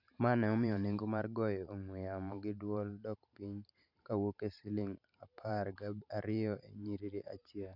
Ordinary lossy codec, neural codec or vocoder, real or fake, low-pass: none; vocoder, 44.1 kHz, 128 mel bands every 512 samples, BigVGAN v2; fake; 5.4 kHz